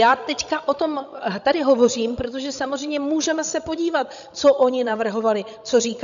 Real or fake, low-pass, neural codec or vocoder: fake; 7.2 kHz; codec, 16 kHz, 16 kbps, FreqCodec, larger model